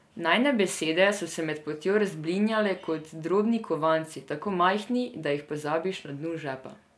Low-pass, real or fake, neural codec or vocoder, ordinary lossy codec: none; real; none; none